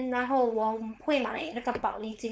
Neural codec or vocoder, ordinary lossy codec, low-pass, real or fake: codec, 16 kHz, 4.8 kbps, FACodec; none; none; fake